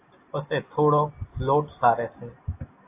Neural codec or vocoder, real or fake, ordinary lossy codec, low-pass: none; real; AAC, 24 kbps; 3.6 kHz